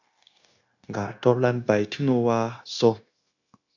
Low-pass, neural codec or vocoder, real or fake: 7.2 kHz; codec, 16 kHz, 0.9 kbps, LongCat-Audio-Codec; fake